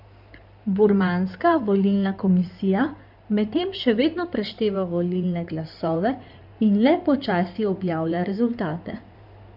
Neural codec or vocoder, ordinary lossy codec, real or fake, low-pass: codec, 16 kHz in and 24 kHz out, 2.2 kbps, FireRedTTS-2 codec; none; fake; 5.4 kHz